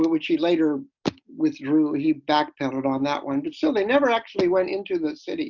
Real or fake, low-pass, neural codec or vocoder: real; 7.2 kHz; none